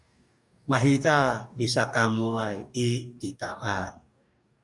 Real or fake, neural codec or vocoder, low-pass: fake; codec, 44.1 kHz, 2.6 kbps, DAC; 10.8 kHz